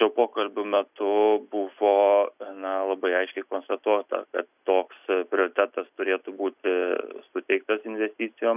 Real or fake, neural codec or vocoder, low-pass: real; none; 3.6 kHz